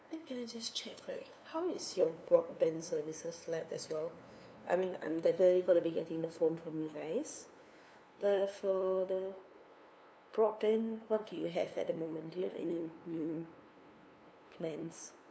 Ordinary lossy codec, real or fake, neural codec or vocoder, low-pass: none; fake; codec, 16 kHz, 2 kbps, FunCodec, trained on LibriTTS, 25 frames a second; none